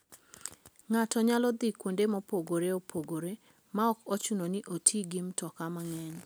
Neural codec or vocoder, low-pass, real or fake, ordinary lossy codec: none; none; real; none